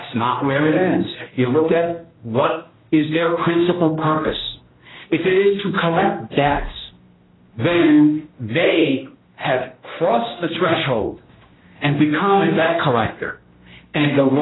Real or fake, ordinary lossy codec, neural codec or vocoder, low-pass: fake; AAC, 16 kbps; codec, 16 kHz, 1 kbps, X-Codec, HuBERT features, trained on general audio; 7.2 kHz